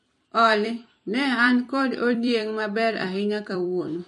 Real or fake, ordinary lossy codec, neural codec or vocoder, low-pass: fake; MP3, 48 kbps; vocoder, 44.1 kHz, 128 mel bands every 256 samples, BigVGAN v2; 14.4 kHz